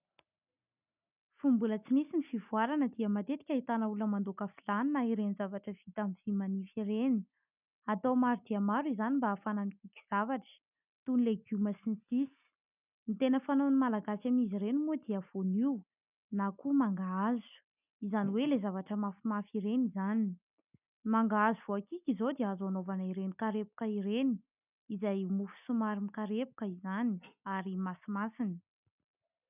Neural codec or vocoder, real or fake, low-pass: none; real; 3.6 kHz